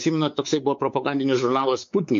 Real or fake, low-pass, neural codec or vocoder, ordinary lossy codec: fake; 7.2 kHz; codec, 16 kHz, 4 kbps, X-Codec, WavLM features, trained on Multilingual LibriSpeech; MP3, 48 kbps